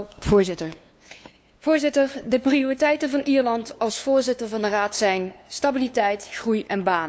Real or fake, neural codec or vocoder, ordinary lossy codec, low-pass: fake; codec, 16 kHz, 2 kbps, FunCodec, trained on LibriTTS, 25 frames a second; none; none